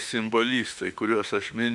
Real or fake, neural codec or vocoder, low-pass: fake; autoencoder, 48 kHz, 32 numbers a frame, DAC-VAE, trained on Japanese speech; 10.8 kHz